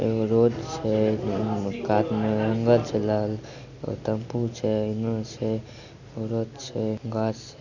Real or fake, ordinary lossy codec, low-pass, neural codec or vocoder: real; none; 7.2 kHz; none